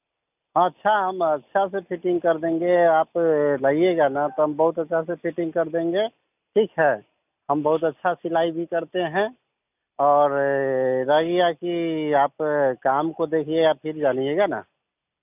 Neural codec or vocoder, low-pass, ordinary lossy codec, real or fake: none; 3.6 kHz; none; real